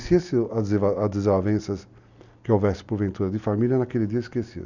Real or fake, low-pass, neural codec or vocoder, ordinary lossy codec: real; 7.2 kHz; none; none